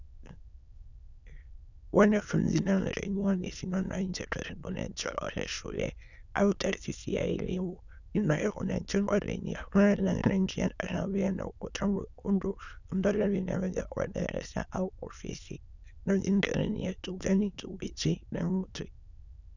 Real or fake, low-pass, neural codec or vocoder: fake; 7.2 kHz; autoencoder, 22.05 kHz, a latent of 192 numbers a frame, VITS, trained on many speakers